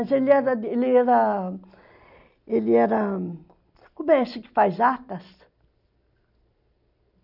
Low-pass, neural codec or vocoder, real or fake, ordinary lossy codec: 5.4 kHz; none; real; none